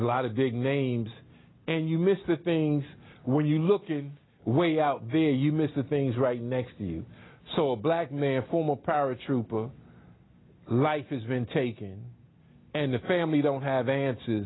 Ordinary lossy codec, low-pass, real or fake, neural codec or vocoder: AAC, 16 kbps; 7.2 kHz; real; none